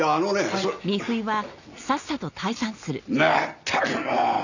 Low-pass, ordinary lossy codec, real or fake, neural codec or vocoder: 7.2 kHz; none; real; none